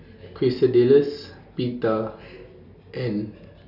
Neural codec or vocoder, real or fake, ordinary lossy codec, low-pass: none; real; none; 5.4 kHz